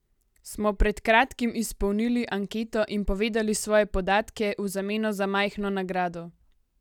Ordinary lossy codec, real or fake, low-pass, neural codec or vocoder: none; real; 19.8 kHz; none